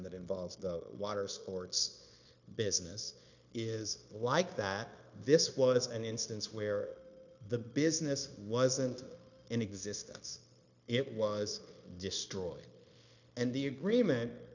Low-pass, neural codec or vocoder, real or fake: 7.2 kHz; codec, 16 kHz, 0.9 kbps, LongCat-Audio-Codec; fake